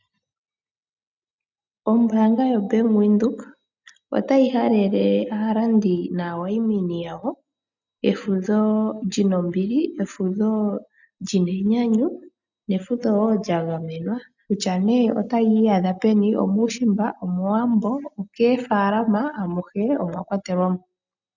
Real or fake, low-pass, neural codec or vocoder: real; 7.2 kHz; none